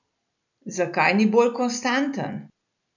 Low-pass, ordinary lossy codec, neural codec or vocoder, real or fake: 7.2 kHz; none; none; real